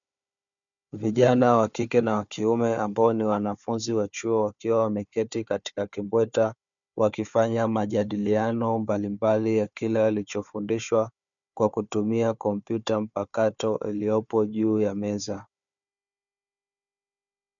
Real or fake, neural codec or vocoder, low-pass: fake; codec, 16 kHz, 4 kbps, FunCodec, trained on Chinese and English, 50 frames a second; 7.2 kHz